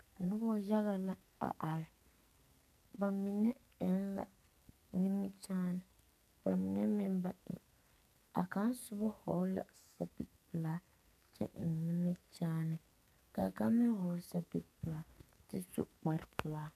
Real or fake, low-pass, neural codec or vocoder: fake; 14.4 kHz; codec, 44.1 kHz, 2.6 kbps, SNAC